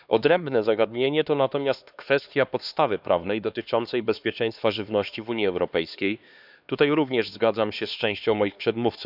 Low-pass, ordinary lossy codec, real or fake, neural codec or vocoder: 5.4 kHz; none; fake; codec, 16 kHz, 2 kbps, X-Codec, HuBERT features, trained on LibriSpeech